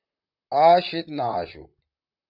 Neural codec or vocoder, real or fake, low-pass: vocoder, 44.1 kHz, 128 mel bands, Pupu-Vocoder; fake; 5.4 kHz